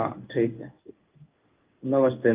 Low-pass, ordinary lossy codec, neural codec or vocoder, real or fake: 3.6 kHz; Opus, 32 kbps; codec, 16 kHz in and 24 kHz out, 1 kbps, XY-Tokenizer; fake